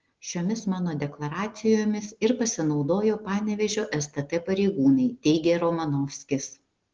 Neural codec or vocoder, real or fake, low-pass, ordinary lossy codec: none; real; 7.2 kHz; Opus, 16 kbps